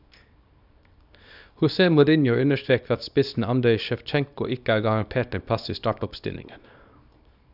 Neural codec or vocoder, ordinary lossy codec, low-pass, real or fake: codec, 24 kHz, 0.9 kbps, WavTokenizer, small release; none; 5.4 kHz; fake